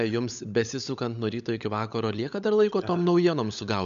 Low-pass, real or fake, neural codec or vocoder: 7.2 kHz; fake; codec, 16 kHz, 16 kbps, FunCodec, trained on LibriTTS, 50 frames a second